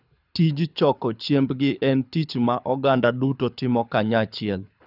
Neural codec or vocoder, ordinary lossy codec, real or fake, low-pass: codec, 24 kHz, 6 kbps, HILCodec; none; fake; 5.4 kHz